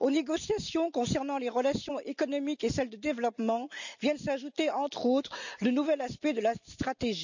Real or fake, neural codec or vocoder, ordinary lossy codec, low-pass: real; none; none; 7.2 kHz